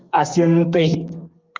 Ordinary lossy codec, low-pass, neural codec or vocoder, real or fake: Opus, 24 kbps; 7.2 kHz; codec, 32 kHz, 1.9 kbps, SNAC; fake